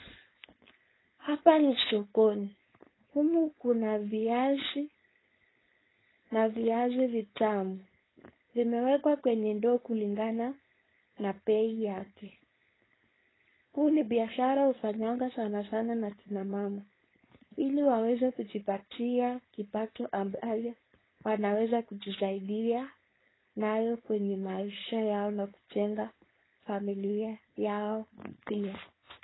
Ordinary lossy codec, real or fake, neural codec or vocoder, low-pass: AAC, 16 kbps; fake; codec, 16 kHz, 4.8 kbps, FACodec; 7.2 kHz